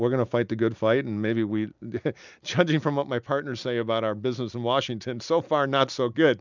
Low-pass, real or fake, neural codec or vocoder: 7.2 kHz; real; none